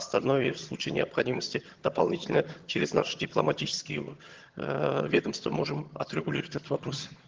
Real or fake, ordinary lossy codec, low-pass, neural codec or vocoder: fake; Opus, 16 kbps; 7.2 kHz; vocoder, 22.05 kHz, 80 mel bands, HiFi-GAN